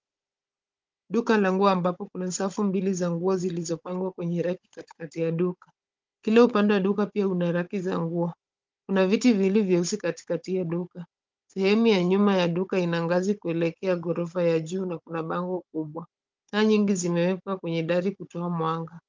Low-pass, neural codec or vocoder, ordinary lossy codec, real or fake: 7.2 kHz; codec, 16 kHz, 16 kbps, FunCodec, trained on Chinese and English, 50 frames a second; Opus, 32 kbps; fake